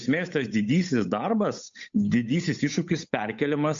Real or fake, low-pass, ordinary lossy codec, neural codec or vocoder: fake; 7.2 kHz; MP3, 48 kbps; codec, 16 kHz, 8 kbps, FunCodec, trained on Chinese and English, 25 frames a second